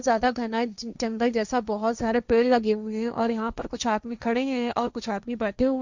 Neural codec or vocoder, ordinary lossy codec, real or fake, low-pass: codec, 16 kHz, 1.1 kbps, Voila-Tokenizer; Opus, 64 kbps; fake; 7.2 kHz